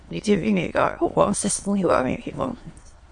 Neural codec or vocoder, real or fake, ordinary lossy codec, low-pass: autoencoder, 22.05 kHz, a latent of 192 numbers a frame, VITS, trained on many speakers; fake; MP3, 48 kbps; 9.9 kHz